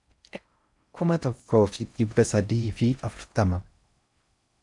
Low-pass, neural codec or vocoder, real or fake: 10.8 kHz; codec, 16 kHz in and 24 kHz out, 0.6 kbps, FocalCodec, streaming, 4096 codes; fake